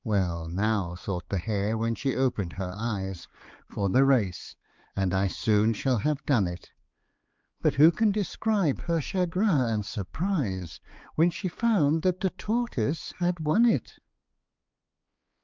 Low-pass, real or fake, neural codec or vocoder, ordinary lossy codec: 7.2 kHz; fake; codec, 16 kHz, 4 kbps, X-Codec, HuBERT features, trained on balanced general audio; Opus, 24 kbps